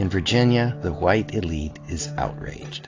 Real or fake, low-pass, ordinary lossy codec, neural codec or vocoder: real; 7.2 kHz; AAC, 32 kbps; none